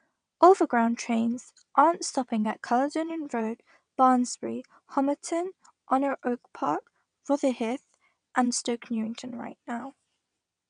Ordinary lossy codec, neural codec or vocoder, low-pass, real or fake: none; vocoder, 22.05 kHz, 80 mel bands, WaveNeXt; 9.9 kHz; fake